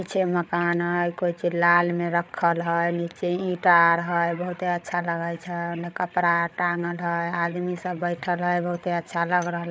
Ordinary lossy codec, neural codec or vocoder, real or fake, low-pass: none; codec, 16 kHz, 8 kbps, FreqCodec, larger model; fake; none